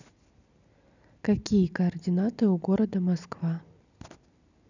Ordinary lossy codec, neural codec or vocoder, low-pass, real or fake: none; none; 7.2 kHz; real